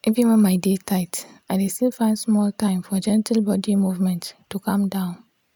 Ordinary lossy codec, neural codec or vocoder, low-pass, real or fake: none; none; none; real